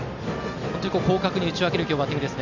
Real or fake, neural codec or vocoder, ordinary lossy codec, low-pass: real; none; none; 7.2 kHz